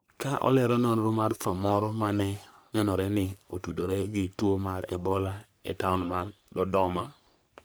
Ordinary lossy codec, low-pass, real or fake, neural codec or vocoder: none; none; fake; codec, 44.1 kHz, 3.4 kbps, Pupu-Codec